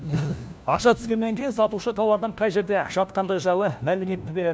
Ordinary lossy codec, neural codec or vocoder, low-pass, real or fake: none; codec, 16 kHz, 1 kbps, FunCodec, trained on LibriTTS, 50 frames a second; none; fake